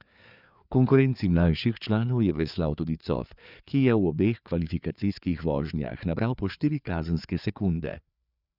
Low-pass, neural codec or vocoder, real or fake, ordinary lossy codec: 5.4 kHz; codec, 16 kHz, 4 kbps, FreqCodec, larger model; fake; none